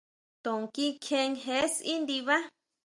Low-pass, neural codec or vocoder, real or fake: 10.8 kHz; none; real